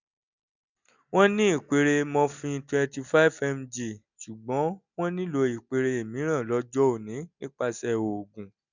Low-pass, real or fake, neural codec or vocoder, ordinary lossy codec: 7.2 kHz; real; none; none